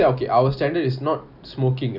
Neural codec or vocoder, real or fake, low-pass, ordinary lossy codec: none; real; 5.4 kHz; none